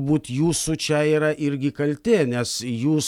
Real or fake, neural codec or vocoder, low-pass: real; none; 19.8 kHz